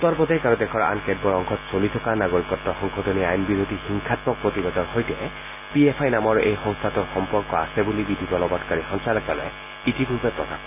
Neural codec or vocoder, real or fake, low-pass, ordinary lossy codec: none; real; 3.6 kHz; none